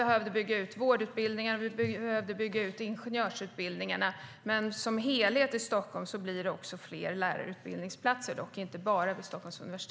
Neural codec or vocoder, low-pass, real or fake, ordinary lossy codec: none; none; real; none